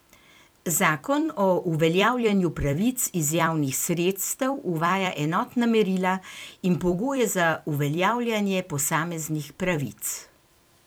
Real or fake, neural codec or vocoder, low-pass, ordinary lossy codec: fake; vocoder, 44.1 kHz, 128 mel bands every 256 samples, BigVGAN v2; none; none